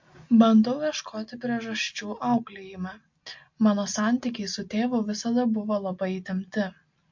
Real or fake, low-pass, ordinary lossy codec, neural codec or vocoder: real; 7.2 kHz; MP3, 48 kbps; none